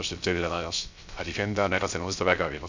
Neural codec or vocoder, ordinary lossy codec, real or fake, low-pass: codec, 16 kHz, 0.3 kbps, FocalCodec; MP3, 64 kbps; fake; 7.2 kHz